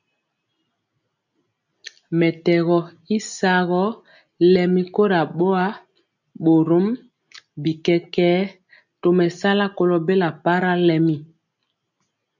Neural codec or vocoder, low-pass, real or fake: none; 7.2 kHz; real